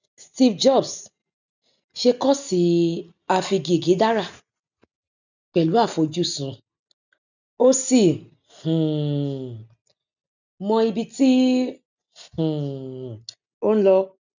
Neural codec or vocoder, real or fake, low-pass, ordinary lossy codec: none; real; 7.2 kHz; none